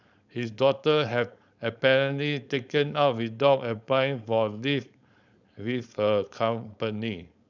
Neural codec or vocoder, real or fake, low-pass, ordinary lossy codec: codec, 16 kHz, 4.8 kbps, FACodec; fake; 7.2 kHz; none